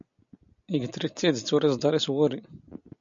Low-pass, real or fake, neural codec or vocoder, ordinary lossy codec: 7.2 kHz; real; none; MP3, 64 kbps